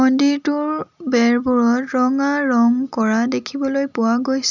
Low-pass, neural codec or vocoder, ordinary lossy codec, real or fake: 7.2 kHz; none; none; real